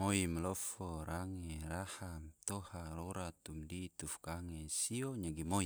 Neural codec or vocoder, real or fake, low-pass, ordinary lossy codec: none; real; none; none